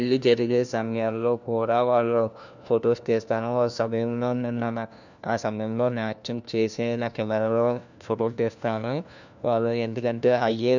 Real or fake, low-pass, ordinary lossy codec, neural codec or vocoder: fake; 7.2 kHz; none; codec, 16 kHz, 1 kbps, FunCodec, trained on LibriTTS, 50 frames a second